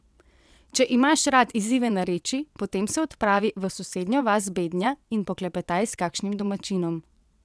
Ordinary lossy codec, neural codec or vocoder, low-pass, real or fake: none; vocoder, 22.05 kHz, 80 mel bands, WaveNeXt; none; fake